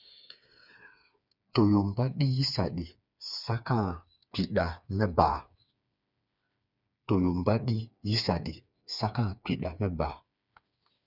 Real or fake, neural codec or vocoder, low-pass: fake; codec, 16 kHz, 4 kbps, FreqCodec, smaller model; 5.4 kHz